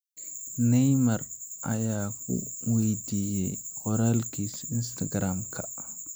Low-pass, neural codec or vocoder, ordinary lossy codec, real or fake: none; none; none; real